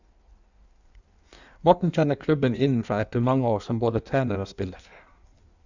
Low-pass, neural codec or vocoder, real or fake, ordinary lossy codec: 7.2 kHz; codec, 16 kHz in and 24 kHz out, 1.1 kbps, FireRedTTS-2 codec; fake; none